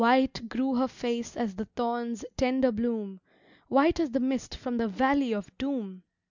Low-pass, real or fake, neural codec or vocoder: 7.2 kHz; real; none